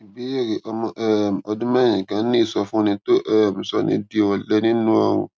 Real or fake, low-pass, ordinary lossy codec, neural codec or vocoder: real; none; none; none